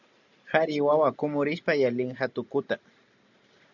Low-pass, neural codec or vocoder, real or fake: 7.2 kHz; none; real